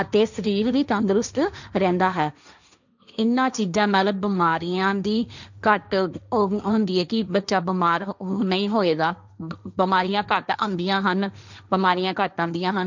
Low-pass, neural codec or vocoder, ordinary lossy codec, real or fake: 7.2 kHz; codec, 16 kHz, 1.1 kbps, Voila-Tokenizer; none; fake